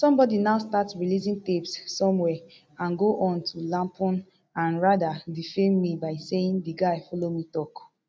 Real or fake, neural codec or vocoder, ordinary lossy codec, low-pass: real; none; none; none